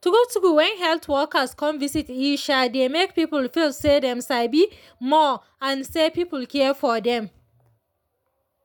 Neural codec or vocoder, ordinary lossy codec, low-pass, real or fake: none; none; none; real